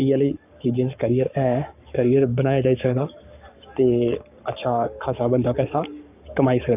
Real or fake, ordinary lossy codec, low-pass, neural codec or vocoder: fake; none; 3.6 kHz; codec, 44.1 kHz, 7.8 kbps, Pupu-Codec